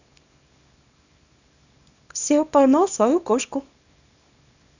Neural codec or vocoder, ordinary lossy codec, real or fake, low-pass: codec, 24 kHz, 0.9 kbps, WavTokenizer, small release; none; fake; 7.2 kHz